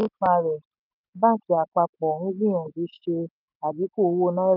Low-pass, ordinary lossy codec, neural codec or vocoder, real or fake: 5.4 kHz; none; none; real